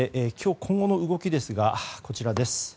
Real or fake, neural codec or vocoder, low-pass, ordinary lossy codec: real; none; none; none